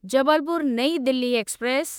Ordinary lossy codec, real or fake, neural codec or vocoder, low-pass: none; fake; autoencoder, 48 kHz, 32 numbers a frame, DAC-VAE, trained on Japanese speech; none